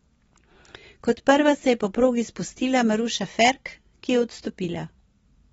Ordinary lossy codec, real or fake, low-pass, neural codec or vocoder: AAC, 24 kbps; fake; 19.8 kHz; vocoder, 44.1 kHz, 128 mel bands every 256 samples, BigVGAN v2